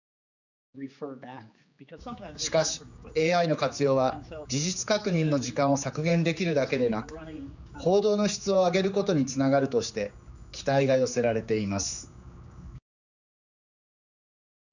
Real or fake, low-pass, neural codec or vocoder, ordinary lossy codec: fake; 7.2 kHz; codec, 16 kHz, 4 kbps, X-Codec, HuBERT features, trained on general audio; none